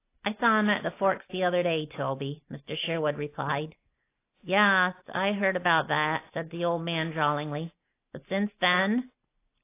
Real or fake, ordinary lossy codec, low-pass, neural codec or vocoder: real; AAC, 24 kbps; 3.6 kHz; none